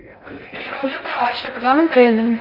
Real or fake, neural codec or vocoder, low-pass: fake; codec, 16 kHz in and 24 kHz out, 0.6 kbps, FocalCodec, streaming, 4096 codes; 5.4 kHz